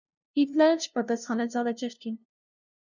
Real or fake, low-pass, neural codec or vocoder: fake; 7.2 kHz; codec, 16 kHz, 0.5 kbps, FunCodec, trained on LibriTTS, 25 frames a second